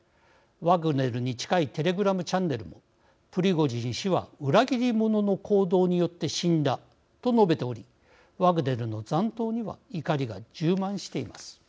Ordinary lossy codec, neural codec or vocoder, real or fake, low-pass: none; none; real; none